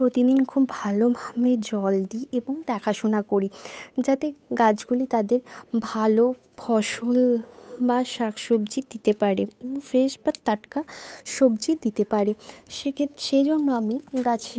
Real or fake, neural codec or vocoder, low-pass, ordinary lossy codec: fake; codec, 16 kHz, 8 kbps, FunCodec, trained on Chinese and English, 25 frames a second; none; none